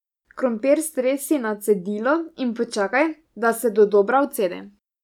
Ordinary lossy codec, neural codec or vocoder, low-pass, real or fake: none; none; 19.8 kHz; real